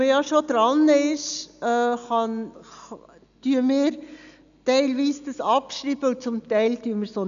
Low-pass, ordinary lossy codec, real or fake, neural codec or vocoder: 7.2 kHz; none; real; none